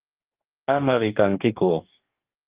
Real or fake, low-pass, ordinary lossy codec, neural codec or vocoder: fake; 3.6 kHz; Opus, 32 kbps; codec, 44.1 kHz, 2.6 kbps, DAC